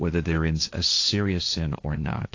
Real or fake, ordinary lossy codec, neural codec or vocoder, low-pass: fake; AAC, 48 kbps; codec, 16 kHz, 1.1 kbps, Voila-Tokenizer; 7.2 kHz